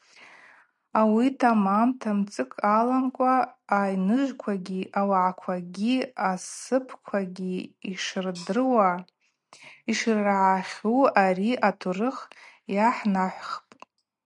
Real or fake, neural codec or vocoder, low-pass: real; none; 10.8 kHz